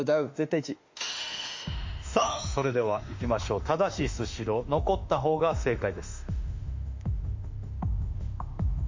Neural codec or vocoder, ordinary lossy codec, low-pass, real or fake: autoencoder, 48 kHz, 32 numbers a frame, DAC-VAE, trained on Japanese speech; MP3, 48 kbps; 7.2 kHz; fake